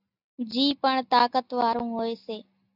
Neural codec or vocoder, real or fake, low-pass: none; real; 5.4 kHz